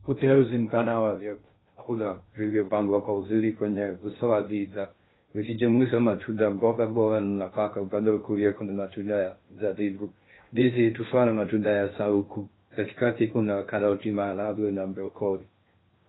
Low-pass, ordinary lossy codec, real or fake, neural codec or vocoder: 7.2 kHz; AAC, 16 kbps; fake; codec, 16 kHz in and 24 kHz out, 0.6 kbps, FocalCodec, streaming, 2048 codes